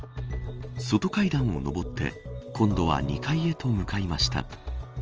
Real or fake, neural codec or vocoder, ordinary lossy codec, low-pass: real; none; Opus, 24 kbps; 7.2 kHz